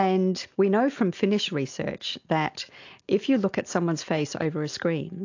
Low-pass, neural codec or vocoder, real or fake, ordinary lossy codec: 7.2 kHz; none; real; AAC, 48 kbps